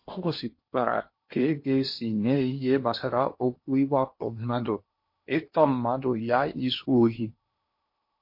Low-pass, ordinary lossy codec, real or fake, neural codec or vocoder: 5.4 kHz; MP3, 32 kbps; fake; codec, 16 kHz in and 24 kHz out, 0.8 kbps, FocalCodec, streaming, 65536 codes